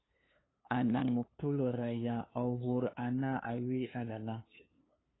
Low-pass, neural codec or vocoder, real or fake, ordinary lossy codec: 7.2 kHz; codec, 16 kHz, 2 kbps, FunCodec, trained on LibriTTS, 25 frames a second; fake; AAC, 16 kbps